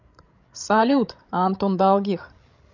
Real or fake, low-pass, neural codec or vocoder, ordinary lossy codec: fake; 7.2 kHz; codec, 16 kHz, 16 kbps, FreqCodec, larger model; none